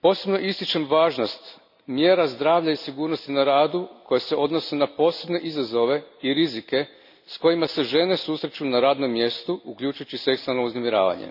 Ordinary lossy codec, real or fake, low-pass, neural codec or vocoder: AAC, 48 kbps; real; 5.4 kHz; none